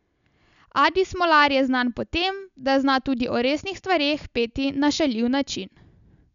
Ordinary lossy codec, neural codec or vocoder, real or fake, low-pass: none; none; real; 7.2 kHz